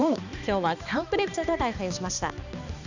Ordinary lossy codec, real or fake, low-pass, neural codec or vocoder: none; fake; 7.2 kHz; codec, 16 kHz, 2 kbps, X-Codec, HuBERT features, trained on balanced general audio